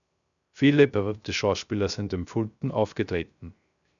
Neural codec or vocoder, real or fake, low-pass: codec, 16 kHz, 0.3 kbps, FocalCodec; fake; 7.2 kHz